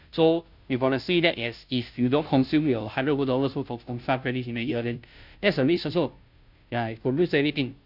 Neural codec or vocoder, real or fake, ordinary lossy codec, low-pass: codec, 16 kHz, 0.5 kbps, FunCodec, trained on Chinese and English, 25 frames a second; fake; none; 5.4 kHz